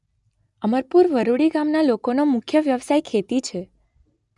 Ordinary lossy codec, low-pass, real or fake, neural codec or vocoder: none; 10.8 kHz; real; none